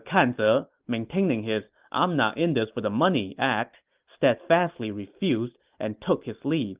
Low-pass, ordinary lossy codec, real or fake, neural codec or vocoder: 3.6 kHz; Opus, 24 kbps; real; none